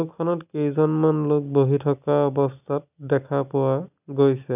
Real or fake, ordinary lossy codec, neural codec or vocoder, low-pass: real; none; none; 3.6 kHz